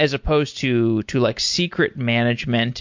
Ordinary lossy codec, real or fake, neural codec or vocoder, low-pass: MP3, 48 kbps; real; none; 7.2 kHz